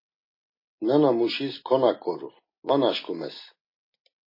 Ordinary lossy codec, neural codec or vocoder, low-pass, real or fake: MP3, 24 kbps; none; 5.4 kHz; real